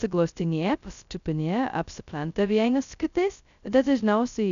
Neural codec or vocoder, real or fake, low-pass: codec, 16 kHz, 0.2 kbps, FocalCodec; fake; 7.2 kHz